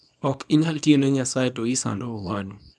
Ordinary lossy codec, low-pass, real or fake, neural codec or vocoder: none; none; fake; codec, 24 kHz, 0.9 kbps, WavTokenizer, small release